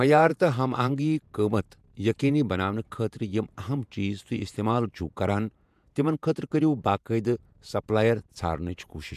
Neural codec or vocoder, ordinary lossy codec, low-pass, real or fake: vocoder, 48 kHz, 128 mel bands, Vocos; MP3, 96 kbps; 14.4 kHz; fake